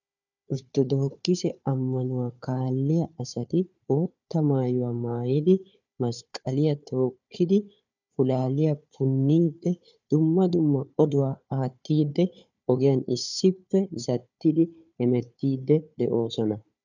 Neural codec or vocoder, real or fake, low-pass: codec, 16 kHz, 4 kbps, FunCodec, trained on Chinese and English, 50 frames a second; fake; 7.2 kHz